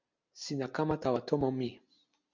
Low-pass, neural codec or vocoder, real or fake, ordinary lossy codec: 7.2 kHz; none; real; MP3, 64 kbps